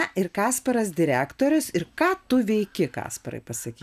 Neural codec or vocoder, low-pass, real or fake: none; 14.4 kHz; real